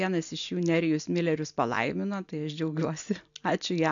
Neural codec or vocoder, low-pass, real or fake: none; 7.2 kHz; real